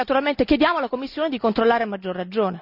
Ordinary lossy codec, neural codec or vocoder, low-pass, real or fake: none; none; 5.4 kHz; real